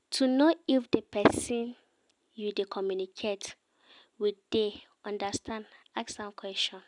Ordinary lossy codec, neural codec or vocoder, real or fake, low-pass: none; none; real; 10.8 kHz